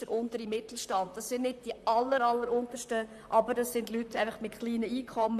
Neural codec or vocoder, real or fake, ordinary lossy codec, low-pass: vocoder, 44.1 kHz, 128 mel bands, Pupu-Vocoder; fake; AAC, 96 kbps; 14.4 kHz